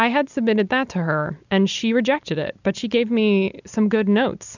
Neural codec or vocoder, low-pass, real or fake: none; 7.2 kHz; real